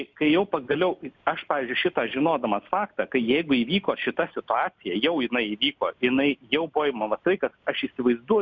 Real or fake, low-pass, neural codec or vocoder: real; 7.2 kHz; none